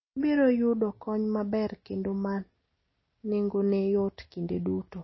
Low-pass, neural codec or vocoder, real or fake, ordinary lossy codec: 7.2 kHz; none; real; MP3, 24 kbps